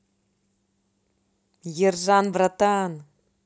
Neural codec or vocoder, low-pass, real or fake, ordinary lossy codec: none; none; real; none